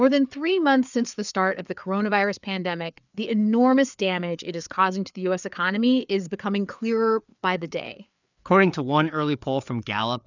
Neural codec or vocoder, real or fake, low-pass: codec, 16 kHz, 4 kbps, FreqCodec, larger model; fake; 7.2 kHz